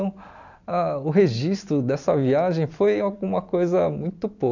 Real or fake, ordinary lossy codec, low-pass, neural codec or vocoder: real; none; 7.2 kHz; none